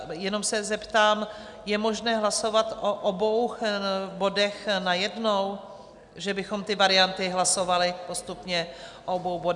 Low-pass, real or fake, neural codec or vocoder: 10.8 kHz; real; none